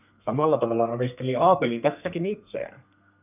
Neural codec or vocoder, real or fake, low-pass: codec, 32 kHz, 1.9 kbps, SNAC; fake; 3.6 kHz